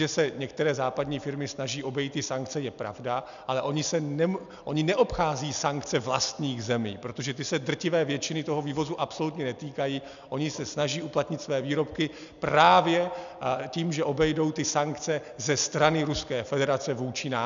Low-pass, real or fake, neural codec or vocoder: 7.2 kHz; real; none